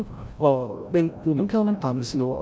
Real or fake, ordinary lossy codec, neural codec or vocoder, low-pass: fake; none; codec, 16 kHz, 0.5 kbps, FreqCodec, larger model; none